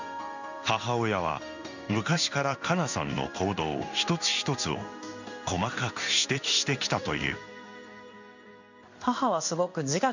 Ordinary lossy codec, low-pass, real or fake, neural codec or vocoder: none; 7.2 kHz; fake; codec, 16 kHz in and 24 kHz out, 1 kbps, XY-Tokenizer